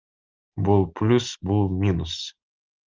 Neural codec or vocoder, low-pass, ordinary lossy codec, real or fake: none; 7.2 kHz; Opus, 32 kbps; real